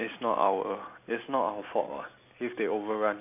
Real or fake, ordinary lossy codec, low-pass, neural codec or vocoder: real; none; 3.6 kHz; none